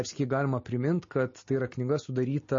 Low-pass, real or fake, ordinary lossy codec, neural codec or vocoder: 7.2 kHz; real; MP3, 32 kbps; none